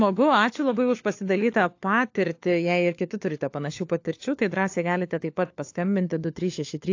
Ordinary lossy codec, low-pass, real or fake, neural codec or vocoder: AAC, 48 kbps; 7.2 kHz; fake; codec, 16 kHz, 4 kbps, FunCodec, trained on LibriTTS, 50 frames a second